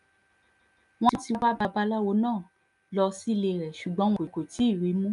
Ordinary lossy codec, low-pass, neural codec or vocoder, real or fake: none; 10.8 kHz; none; real